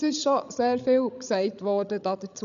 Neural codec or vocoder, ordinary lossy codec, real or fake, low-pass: codec, 16 kHz, 16 kbps, FreqCodec, larger model; none; fake; 7.2 kHz